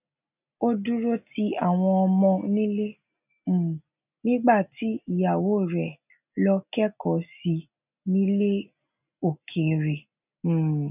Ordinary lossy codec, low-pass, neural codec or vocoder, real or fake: none; 3.6 kHz; none; real